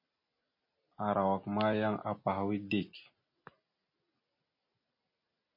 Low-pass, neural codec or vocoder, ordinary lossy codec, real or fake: 5.4 kHz; none; MP3, 24 kbps; real